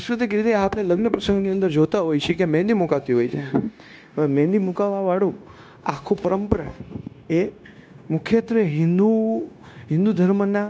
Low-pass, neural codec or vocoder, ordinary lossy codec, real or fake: none; codec, 16 kHz, 0.9 kbps, LongCat-Audio-Codec; none; fake